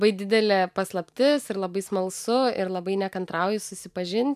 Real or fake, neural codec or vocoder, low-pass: fake; vocoder, 44.1 kHz, 128 mel bands every 512 samples, BigVGAN v2; 14.4 kHz